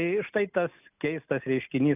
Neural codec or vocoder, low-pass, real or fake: none; 3.6 kHz; real